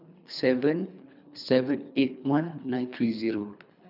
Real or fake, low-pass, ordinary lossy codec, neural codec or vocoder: fake; 5.4 kHz; none; codec, 24 kHz, 3 kbps, HILCodec